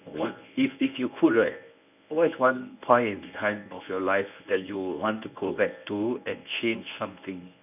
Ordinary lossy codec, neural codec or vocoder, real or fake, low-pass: none; codec, 24 kHz, 0.9 kbps, WavTokenizer, medium speech release version 1; fake; 3.6 kHz